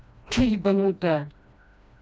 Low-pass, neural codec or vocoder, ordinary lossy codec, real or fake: none; codec, 16 kHz, 1 kbps, FreqCodec, smaller model; none; fake